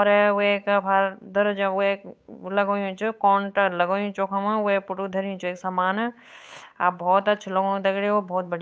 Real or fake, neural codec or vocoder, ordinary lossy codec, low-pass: fake; codec, 16 kHz, 8 kbps, FunCodec, trained on Chinese and English, 25 frames a second; none; none